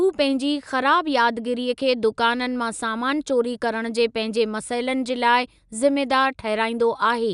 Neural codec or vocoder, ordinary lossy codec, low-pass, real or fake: none; none; 10.8 kHz; real